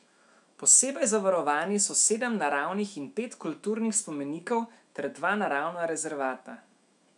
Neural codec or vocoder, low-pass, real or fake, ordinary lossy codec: autoencoder, 48 kHz, 128 numbers a frame, DAC-VAE, trained on Japanese speech; 10.8 kHz; fake; MP3, 96 kbps